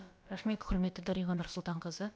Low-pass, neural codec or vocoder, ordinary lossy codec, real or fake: none; codec, 16 kHz, about 1 kbps, DyCAST, with the encoder's durations; none; fake